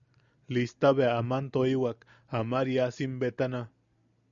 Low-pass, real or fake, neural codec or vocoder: 7.2 kHz; real; none